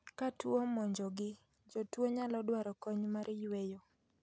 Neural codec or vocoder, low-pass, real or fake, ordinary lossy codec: none; none; real; none